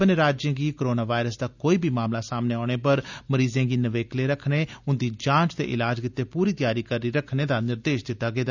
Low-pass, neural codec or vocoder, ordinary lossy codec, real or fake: 7.2 kHz; none; none; real